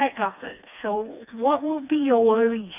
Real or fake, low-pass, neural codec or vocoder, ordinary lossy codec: fake; 3.6 kHz; codec, 16 kHz, 2 kbps, FreqCodec, smaller model; none